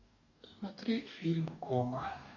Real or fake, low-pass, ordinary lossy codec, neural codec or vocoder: fake; 7.2 kHz; none; codec, 44.1 kHz, 2.6 kbps, DAC